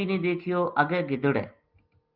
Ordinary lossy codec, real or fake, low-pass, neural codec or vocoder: Opus, 24 kbps; real; 5.4 kHz; none